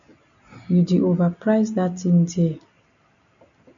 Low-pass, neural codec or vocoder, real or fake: 7.2 kHz; none; real